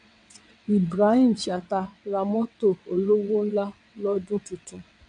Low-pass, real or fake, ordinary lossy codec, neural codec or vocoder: 9.9 kHz; fake; none; vocoder, 22.05 kHz, 80 mel bands, WaveNeXt